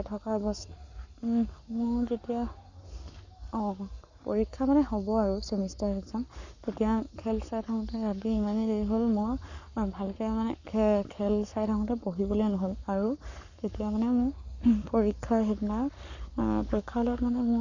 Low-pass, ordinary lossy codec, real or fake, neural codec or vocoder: 7.2 kHz; none; fake; codec, 44.1 kHz, 7.8 kbps, Pupu-Codec